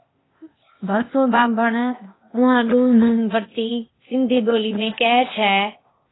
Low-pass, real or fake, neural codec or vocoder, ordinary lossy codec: 7.2 kHz; fake; codec, 16 kHz, 0.8 kbps, ZipCodec; AAC, 16 kbps